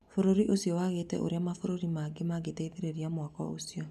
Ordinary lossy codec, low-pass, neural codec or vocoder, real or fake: none; 14.4 kHz; none; real